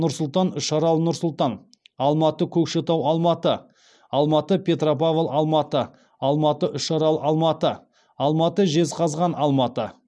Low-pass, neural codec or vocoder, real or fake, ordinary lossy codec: none; none; real; none